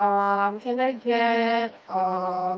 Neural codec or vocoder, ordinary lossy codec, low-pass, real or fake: codec, 16 kHz, 1 kbps, FreqCodec, smaller model; none; none; fake